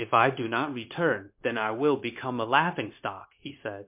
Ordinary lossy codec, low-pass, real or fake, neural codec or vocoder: MP3, 32 kbps; 3.6 kHz; fake; codec, 16 kHz, 0.9 kbps, LongCat-Audio-Codec